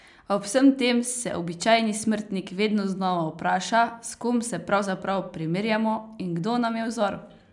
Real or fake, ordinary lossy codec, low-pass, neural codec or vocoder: real; none; 10.8 kHz; none